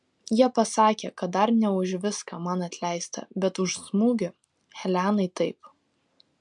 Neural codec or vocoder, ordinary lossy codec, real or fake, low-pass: none; MP3, 64 kbps; real; 10.8 kHz